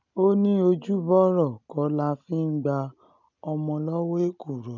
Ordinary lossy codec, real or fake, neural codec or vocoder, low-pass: none; real; none; 7.2 kHz